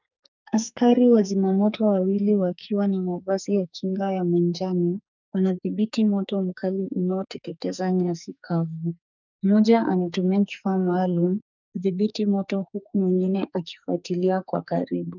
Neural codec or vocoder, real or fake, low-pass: codec, 44.1 kHz, 2.6 kbps, SNAC; fake; 7.2 kHz